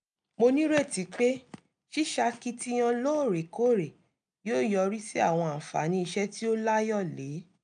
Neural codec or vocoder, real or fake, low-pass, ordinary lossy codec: none; real; 9.9 kHz; none